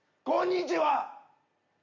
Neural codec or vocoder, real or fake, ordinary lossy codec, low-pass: none; real; Opus, 64 kbps; 7.2 kHz